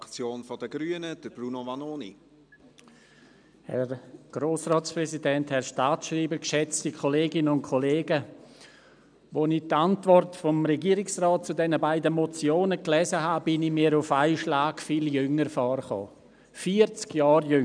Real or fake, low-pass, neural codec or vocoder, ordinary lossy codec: real; 9.9 kHz; none; none